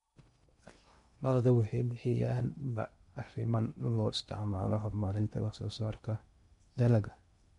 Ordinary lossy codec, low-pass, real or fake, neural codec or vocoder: MP3, 64 kbps; 10.8 kHz; fake; codec, 16 kHz in and 24 kHz out, 0.8 kbps, FocalCodec, streaming, 65536 codes